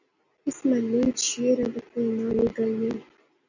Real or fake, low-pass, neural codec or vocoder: real; 7.2 kHz; none